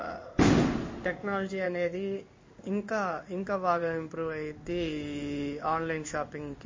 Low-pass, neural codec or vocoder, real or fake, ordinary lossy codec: 7.2 kHz; codec, 16 kHz in and 24 kHz out, 1 kbps, XY-Tokenizer; fake; MP3, 32 kbps